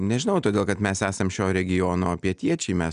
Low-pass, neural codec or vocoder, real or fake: 9.9 kHz; none; real